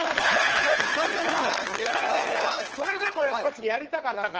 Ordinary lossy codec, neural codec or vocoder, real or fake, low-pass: Opus, 16 kbps; codec, 16 kHz, 4 kbps, FunCodec, trained on LibriTTS, 50 frames a second; fake; 7.2 kHz